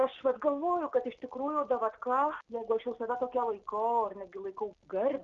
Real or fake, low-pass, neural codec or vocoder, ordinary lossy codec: real; 7.2 kHz; none; Opus, 32 kbps